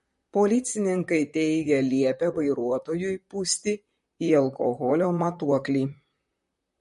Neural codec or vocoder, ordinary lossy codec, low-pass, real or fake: vocoder, 44.1 kHz, 128 mel bands, Pupu-Vocoder; MP3, 48 kbps; 14.4 kHz; fake